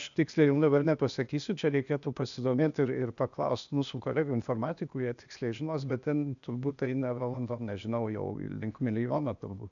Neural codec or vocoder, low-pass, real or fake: codec, 16 kHz, 0.8 kbps, ZipCodec; 7.2 kHz; fake